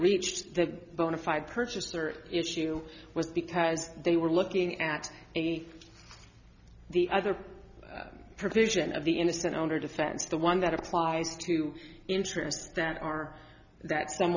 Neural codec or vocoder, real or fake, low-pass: none; real; 7.2 kHz